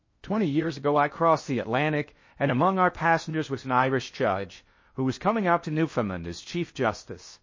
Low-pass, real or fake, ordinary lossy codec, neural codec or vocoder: 7.2 kHz; fake; MP3, 32 kbps; codec, 16 kHz in and 24 kHz out, 0.6 kbps, FocalCodec, streaming, 2048 codes